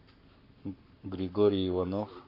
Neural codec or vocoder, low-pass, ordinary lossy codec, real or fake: codec, 44.1 kHz, 7.8 kbps, Pupu-Codec; 5.4 kHz; AAC, 48 kbps; fake